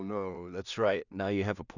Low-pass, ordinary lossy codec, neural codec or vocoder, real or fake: 7.2 kHz; none; codec, 16 kHz in and 24 kHz out, 0.4 kbps, LongCat-Audio-Codec, two codebook decoder; fake